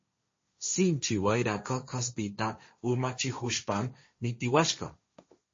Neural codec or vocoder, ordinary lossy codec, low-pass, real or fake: codec, 16 kHz, 1.1 kbps, Voila-Tokenizer; MP3, 32 kbps; 7.2 kHz; fake